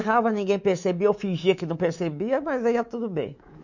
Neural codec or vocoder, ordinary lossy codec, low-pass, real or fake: codec, 24 kHz, 3.1 kbps, DualCodec; none; 7.2 kHz; fake